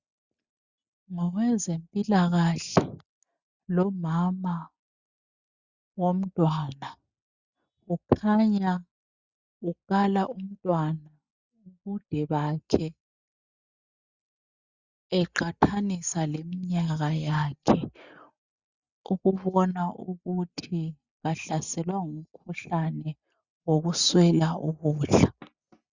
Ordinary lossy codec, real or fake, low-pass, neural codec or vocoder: Opus, 64 kbps; real; 7.2 kHz; none